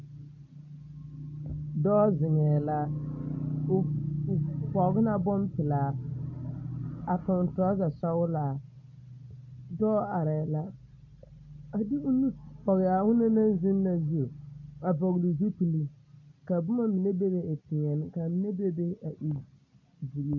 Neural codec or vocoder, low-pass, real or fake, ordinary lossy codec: none; 7.2 kHz; real; MP3, 64 kbps